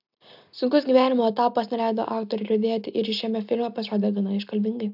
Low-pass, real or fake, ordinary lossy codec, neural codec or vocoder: 5.4 kHz; real; AAC, 48 kbps; none